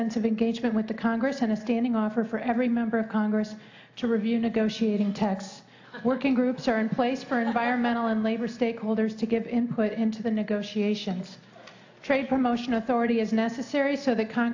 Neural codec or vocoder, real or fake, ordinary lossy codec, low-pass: none; real; AAC, 48 kbps; 7.2 kHz